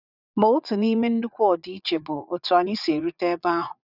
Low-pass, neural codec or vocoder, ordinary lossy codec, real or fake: 5.4 kHz; vocoder, 44.1 kHz, 80 mel bands, Vocos; none; fake